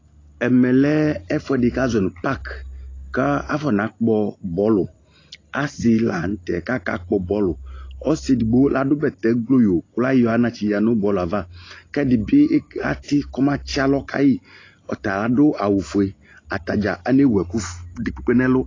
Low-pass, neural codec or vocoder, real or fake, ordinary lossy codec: 7.2 kHz; none; real; AAC, 32 kbps